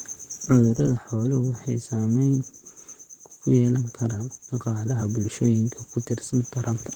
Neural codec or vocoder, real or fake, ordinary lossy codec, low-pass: vocoder, 44.1 kHz, 128 mel bands, Pupu-Vocoder; fake; Opus, 16 kbps; 19.8 kHz